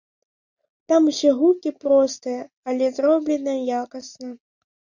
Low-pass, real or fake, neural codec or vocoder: 7.2 kHz; real; none